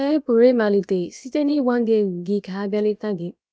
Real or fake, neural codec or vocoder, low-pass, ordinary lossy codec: fake; codec, 16 kHz, about 1 kbps, DyCAST, with the encoder's durations; none; none